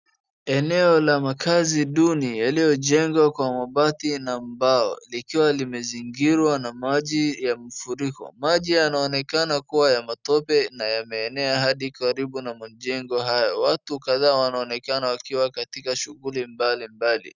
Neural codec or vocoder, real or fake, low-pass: none; real; 7.2 kHz